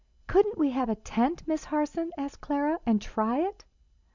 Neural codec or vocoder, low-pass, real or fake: none; 7.2 kHz; real